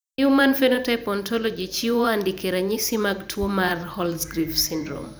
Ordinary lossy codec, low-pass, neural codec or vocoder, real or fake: none; none; vocoder, 44.1 kHz, 128 mel bands every 512 samples, BigVGAN v2; fake